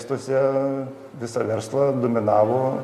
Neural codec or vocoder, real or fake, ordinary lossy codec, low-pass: none; real; MP3, 96 kbps; 14.4 kHz